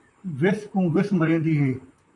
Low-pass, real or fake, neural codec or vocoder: 10.8 kHz; fake; vocoder, 44.1 kHz, 128 mel bands, Pupu-Vocoder